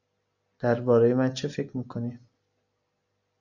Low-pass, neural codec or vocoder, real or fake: 7.2 kHz; none; real